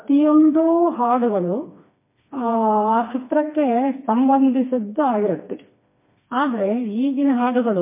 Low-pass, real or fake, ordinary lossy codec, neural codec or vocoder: 3.6 kHz; fake; MP3, 24 kbps; codec, 16 kHz, 2 kbps, FreqCodec, smaller model